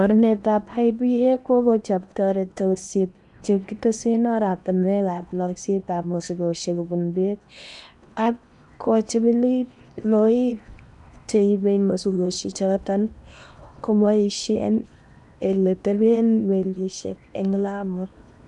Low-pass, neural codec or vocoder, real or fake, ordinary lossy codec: 10.8 kHz; codec, 16 kHz in and 24 kHz out, 0.8 kbps, FocalCodec, streaming, 65536 codes; fake; none